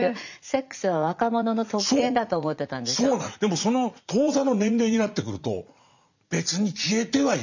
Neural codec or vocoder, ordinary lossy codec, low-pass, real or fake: vocoder, 44.1 kHz, 80 mel bands, Vocos; none; 7.2 kHz; fake